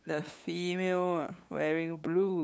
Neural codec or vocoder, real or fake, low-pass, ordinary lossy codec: codec, 16 kHz, 8 kbps, FunCodec, trained on LibriTTS, 25 frames a second; fake; none; none